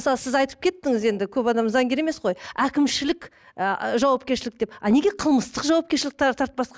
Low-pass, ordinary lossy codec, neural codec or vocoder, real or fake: none; none; none; real